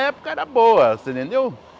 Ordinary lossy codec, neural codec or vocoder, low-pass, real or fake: none; none; none; real